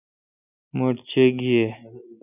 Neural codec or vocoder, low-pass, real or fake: none; 3.6 kHz; real